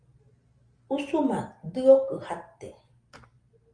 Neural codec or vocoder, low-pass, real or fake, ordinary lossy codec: none; 9.9 kHz; real; Opus, 32 kbps